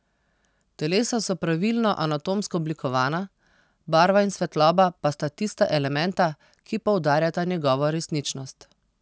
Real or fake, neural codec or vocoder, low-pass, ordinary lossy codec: real; none; none; none